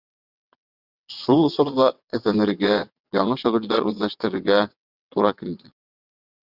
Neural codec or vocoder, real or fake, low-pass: vocoder, 22.05 kHz, 80 mel bands, WaveNeXt; fake; 5.4 kHz